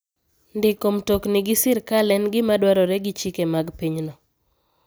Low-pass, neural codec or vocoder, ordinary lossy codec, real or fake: none; none; none; real